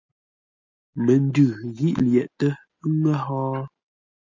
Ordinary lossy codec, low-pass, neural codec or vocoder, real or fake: MP3, 48 kbps; 7.2 kHz; none; real